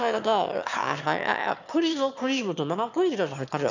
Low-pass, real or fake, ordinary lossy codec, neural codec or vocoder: 7.2 kHz; fake; none; autoencoder, 22.05 kHz, a latent of 192 numbers a frame, VITS, trained on one speaker